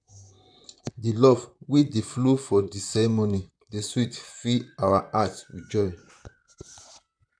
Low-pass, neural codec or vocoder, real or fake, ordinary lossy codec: 9.9 kHz; vocoder, 44.1 kHz, 128 mel bands, Pupu-Vocoder; fake; none